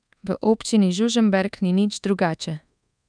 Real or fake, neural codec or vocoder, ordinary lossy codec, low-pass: fake; codec, 24 kHz, 1.2 kbps, DualCodec; none; 9.9 kHz